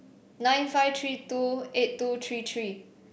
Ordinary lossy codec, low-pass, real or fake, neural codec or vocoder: none; none; real; none